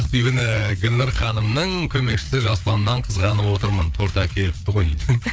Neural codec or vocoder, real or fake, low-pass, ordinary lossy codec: codec, 16 kHz, 4 kbps, FreqCodec, larger model; fake; none; none